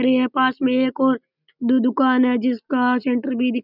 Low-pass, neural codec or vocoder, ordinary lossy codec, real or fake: 5.4 kHz; none; none; real